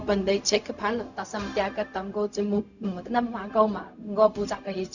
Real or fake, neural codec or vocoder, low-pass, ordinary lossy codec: fake; codec, 16 kHz, 0.4 kbps, LongCat-Audio-Codec; 7.2 kHz; none